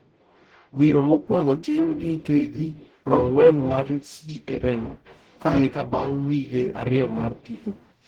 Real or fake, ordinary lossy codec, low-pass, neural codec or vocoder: fake; Opus, 16 kbps; 19.8 kHz; codec, 44.1 kHz, 0.9 kbps, DAC